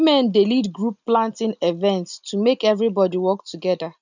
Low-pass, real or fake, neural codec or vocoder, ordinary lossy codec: 7.2 kHz; real; none; none